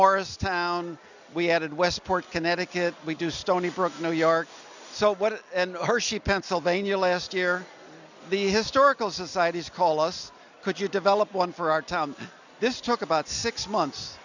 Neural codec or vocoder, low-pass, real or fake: none; 7.2 kHz; real